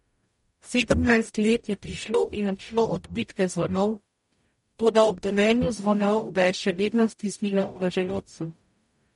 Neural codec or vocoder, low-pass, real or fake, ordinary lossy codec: codec, 44.1 kHz, 0.9 kbps, DAC; 19.8 kHz; fake; MP3, 48 kbps